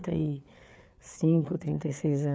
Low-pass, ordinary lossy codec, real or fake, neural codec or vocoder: none; none; fake; codec, 16 kHz, 4 kbps, FreqCodec, larger model